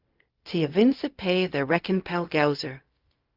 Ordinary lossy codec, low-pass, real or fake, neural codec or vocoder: Opus, 32 kbps; 5.4 kHz; fake; codec, 16 kHz, 0.4 kbps, LongCat-Audio-Codec